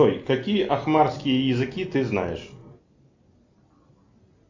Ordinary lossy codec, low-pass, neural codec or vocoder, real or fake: AAC, 48 kbps; 7.2 kHz; none; real